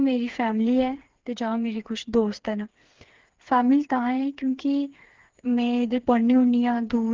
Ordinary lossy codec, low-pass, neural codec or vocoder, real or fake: Opus, 16 kbps; 7.2 kHz; codec, 16 kHz, 4 kbps, FreqCodec, smaller model; fake